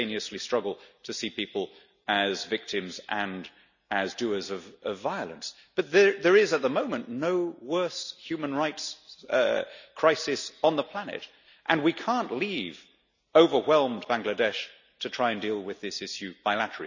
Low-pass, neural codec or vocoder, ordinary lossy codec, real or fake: 7.2 kHz; none; none; real